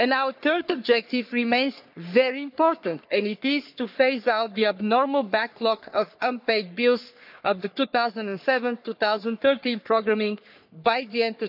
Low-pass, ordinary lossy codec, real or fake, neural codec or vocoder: 5.4 kHz; none; fake; codec, 44.1 kHz, 3.4 kbps, Pupu-Codec